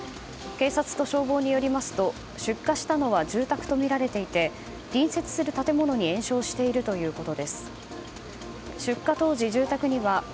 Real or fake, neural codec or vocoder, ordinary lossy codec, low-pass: real; none; none; none